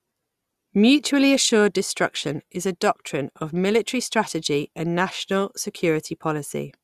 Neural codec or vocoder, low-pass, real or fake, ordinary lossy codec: none; 14.4 kHz; real; Opus, 64 kbps